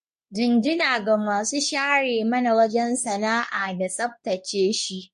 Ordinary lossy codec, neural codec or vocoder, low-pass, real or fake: MP3, 48 kbps; codec, 24 kHz, 0.9 kbps, WavTokenizer, medium speech release version 2; 10.8 kHz; fake